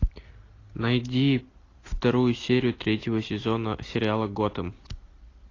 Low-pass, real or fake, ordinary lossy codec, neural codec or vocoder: 7.2 kHz; real; AAC, 32 kbps; none